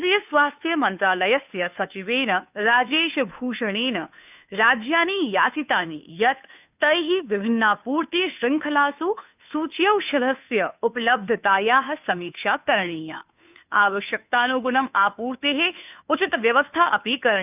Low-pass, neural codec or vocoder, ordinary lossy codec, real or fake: 3.6 kHz; codec, 16 kHz, 2 kbps, FunCodec, trained on Chinese and English, 25 frames a second; none; fake